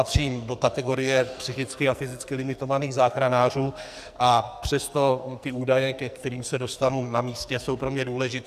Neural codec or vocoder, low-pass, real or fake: codec, 44.1 kHz, 2.6 kbps, SNAC; 14.4 kHz; fake